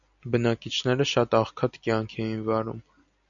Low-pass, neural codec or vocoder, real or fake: 7.2 kHz; none; real